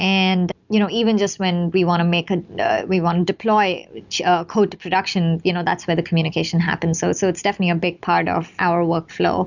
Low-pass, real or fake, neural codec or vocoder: 7.2 kHz; real; none